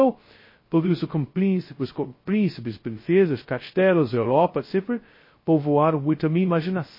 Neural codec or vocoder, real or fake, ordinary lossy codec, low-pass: codec, 16 kHz, 0.2 kbps, FocalCodec; fake; MP3, 24 kbps; 5.4 kHz